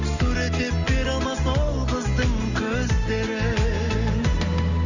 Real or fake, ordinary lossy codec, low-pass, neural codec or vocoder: real; none; 7.2 kHz; none